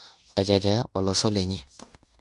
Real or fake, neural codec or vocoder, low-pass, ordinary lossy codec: fake; codec, 16 kHz in and 24 kHz out, 0.9 kbps, LongCat-Audio-Codec, fine tuned four codebook decoder; 10.8 kHz; none